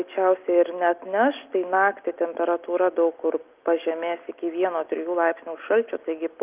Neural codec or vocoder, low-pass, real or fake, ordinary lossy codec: none; 3.6 kHz; real; Opus, 32 kbps